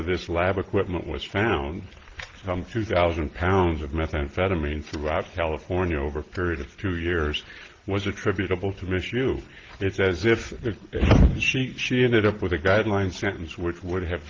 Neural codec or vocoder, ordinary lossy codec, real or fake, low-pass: none; Opus, 16 kbps; real; 7.2 kHz